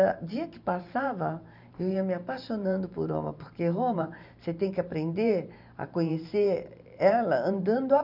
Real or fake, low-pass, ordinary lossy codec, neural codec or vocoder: fake; 5.4 kHz; none; vocoder, 44.1 kHz, 128 mel bands every 512 samples, BigVGAN v2